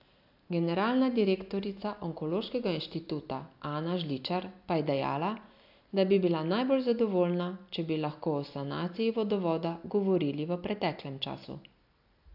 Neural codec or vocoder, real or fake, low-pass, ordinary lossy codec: none; real; 5.4 kHz; AAC, 48 kbps